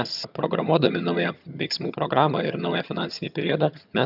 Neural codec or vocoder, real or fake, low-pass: vocoder, 22.05 kHz, 80 mel bands, HiFi-GAN; fake; 5.4 kHz